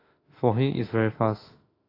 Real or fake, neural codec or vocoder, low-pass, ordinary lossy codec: fake; autoencoder, 48 kHz, 32 numbers a frame, DAC-VAE, trained on Japanese speech; 5.4 kHz; AAC, 24 kbps